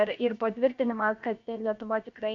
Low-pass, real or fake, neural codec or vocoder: 7.2 kHz; fake; codec, 16 kHz, about 1 kbps, DyCAST, with the encoder's durations